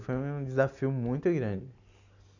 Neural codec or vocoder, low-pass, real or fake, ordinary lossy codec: none; 7.2 kHz; real; none